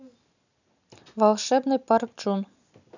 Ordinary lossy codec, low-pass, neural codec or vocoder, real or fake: none; 7.2 kHz; none; real